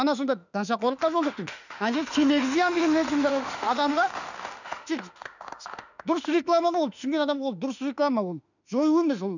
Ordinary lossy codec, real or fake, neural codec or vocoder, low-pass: none; fake; autoencoder, 48 kHz, 32 numbers a frame, DAC-VAE, trained on Japanese speech; 7.2 kHz